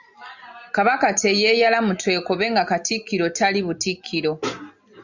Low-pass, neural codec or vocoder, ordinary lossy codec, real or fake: 7.2 kHz; none; Opus, 64 kbps; real